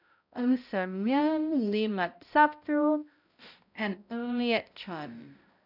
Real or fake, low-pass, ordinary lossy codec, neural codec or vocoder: fake; 5.4 kHz; none; codec, 16 kHz, 0.5 kbps, X-Codec, HuBERT features, trained on balanced general audio